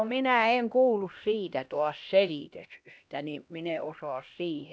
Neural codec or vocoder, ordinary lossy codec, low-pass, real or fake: codec, 16 kHz, 1 kbps, X-Codec, HuBERT features, trained on LibriSpeech; none; none; fake